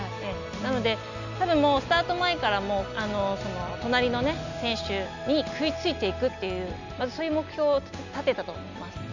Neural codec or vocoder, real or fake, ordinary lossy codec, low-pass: none; real; none; 7.2 kHz